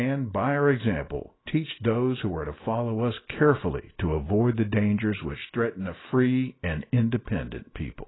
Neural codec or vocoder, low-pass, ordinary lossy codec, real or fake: none; 7.2 kHz; AAC, 16 kbps; real